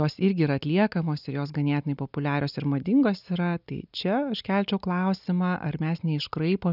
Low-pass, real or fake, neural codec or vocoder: 5.4 kHz; real; none